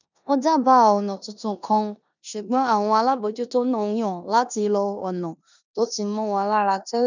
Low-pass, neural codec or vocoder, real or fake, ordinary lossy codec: 7.2 kHz; codec, 16 kHz in and 24 kHz out, 0.9 kbps, LongCat-Audio-Codec, four codebook decoder; fake; none